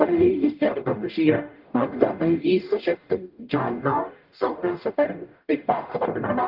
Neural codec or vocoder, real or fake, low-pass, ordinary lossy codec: codec, 44.1 kHz, 0.9 kbps, DAC; fake; 5.4 kHz; Opus, 32 kbps